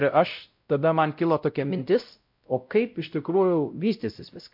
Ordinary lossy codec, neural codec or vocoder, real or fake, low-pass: MP3, 48 kbps; codec, 16 kHz, 0.5 kbps, X-Codec, WavLM features, trained on Multilingual LibriSpeech; fake; 5.4 kHz